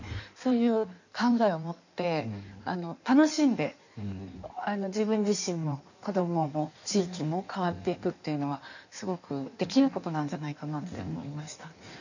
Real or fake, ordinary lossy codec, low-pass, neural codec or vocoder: fake; none; 7.2 kHz; codec, 16 kHz in and 24 kHz out, 1.1 kbps, FireRedTTS-2 codec